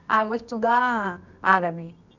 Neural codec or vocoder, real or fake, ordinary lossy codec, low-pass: codec, 24 kHz, 0.9 kbps, WavTokenizer, medium music audio release; fake; none; 7.2 kHz